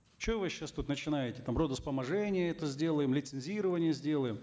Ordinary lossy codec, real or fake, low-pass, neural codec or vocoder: none; real; none; none